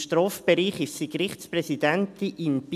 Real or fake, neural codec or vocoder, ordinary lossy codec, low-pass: real; none; MP3, 96 kbps; 14.4 kHz